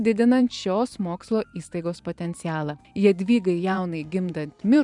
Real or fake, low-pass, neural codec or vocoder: fake; 10.8 kHz; vocoder, 44.1 kHz, 128 mel bands every 512 samples, BigVGAN v2